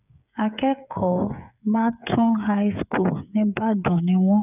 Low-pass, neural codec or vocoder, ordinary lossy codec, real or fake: 3.6 kHz; codec, 16 kHz, 8 kbps, FreqCodec, smaller model; none; fake